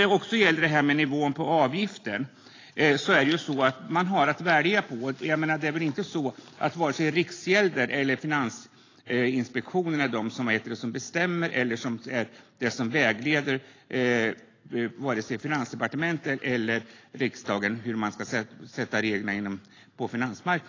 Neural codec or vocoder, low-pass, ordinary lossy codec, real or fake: none; 7.2 kHz; AAC, 32 kbps; real